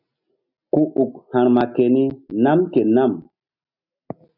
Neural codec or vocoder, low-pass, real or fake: none; 5.4 kHz; real